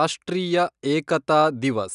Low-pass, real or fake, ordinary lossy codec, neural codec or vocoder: 10.8 kHz; real; none; none